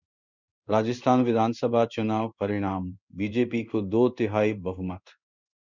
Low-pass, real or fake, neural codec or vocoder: 7.2 kHz; fake; codec, 16 kHz in and 24 kHz out, 1 kbps, XY-Tokenizer